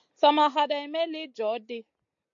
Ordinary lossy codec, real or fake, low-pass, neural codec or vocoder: AAC, 64 kbps; real; 7.2 kHz; none